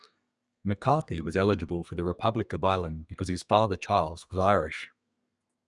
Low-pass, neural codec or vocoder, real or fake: 10.8 kHz; codec, 32 kHz, 1.9 kbps, SNAC; fake